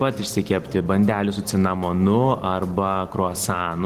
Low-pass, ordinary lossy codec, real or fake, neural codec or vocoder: 14.4 kHz; Opus, 16 kbps; real; none